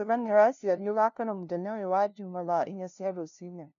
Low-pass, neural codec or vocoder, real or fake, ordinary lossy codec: 7.2 kHz; codec, 16 kHz, 0.5 kbps, FunCodec, trained on LibriTTS, 25 frames a second; fake; none